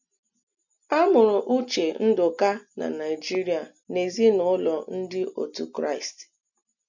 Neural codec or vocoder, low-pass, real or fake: none; 7.2 kHz; real